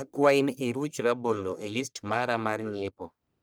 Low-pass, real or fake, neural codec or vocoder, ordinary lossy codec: none; fake; codec, 44.1 kHz, 1.7 kbps, Pupu-Codec; none